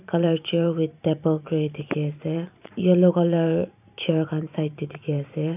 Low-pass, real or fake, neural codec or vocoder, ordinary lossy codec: 3.6 kHz; fake; vocoder, 44.1 kHz, 128 mel bands every 512 samples, BigVGAN v2; none